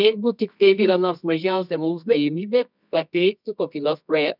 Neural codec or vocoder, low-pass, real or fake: codec, 24 kHz, 0.9 kbps, WavTokenizer, medium music audio release; 5.4 kHz; fake